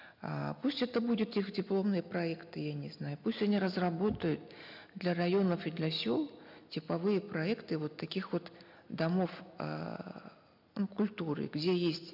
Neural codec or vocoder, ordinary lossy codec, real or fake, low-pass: none; MP3, 48 kbps; real; 5.4 kHz